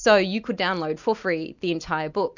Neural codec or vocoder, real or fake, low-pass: none; real; 7.2 kHz